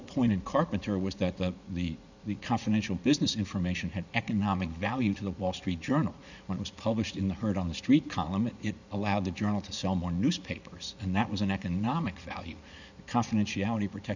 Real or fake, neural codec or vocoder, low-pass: fake; vocoder, 44.1 kHz, 80 mel bands, Vocos; 7.2 kHz